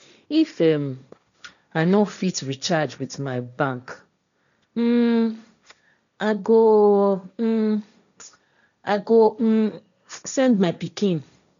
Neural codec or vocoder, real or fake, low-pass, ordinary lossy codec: codec, 16 kHz, 1.1 kbps, Voila-Tokenizer; fake; 7.2 kHz; none